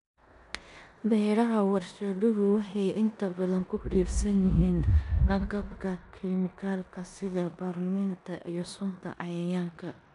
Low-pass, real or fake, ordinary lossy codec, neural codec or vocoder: 10.8 kHz; fake; none; codec, 16 kHz in and 24 kHz out, 0.9 kbps, LongCat-Audio-Codec, four codebook decoder